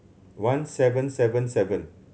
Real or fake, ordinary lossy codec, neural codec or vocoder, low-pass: real; none; none; none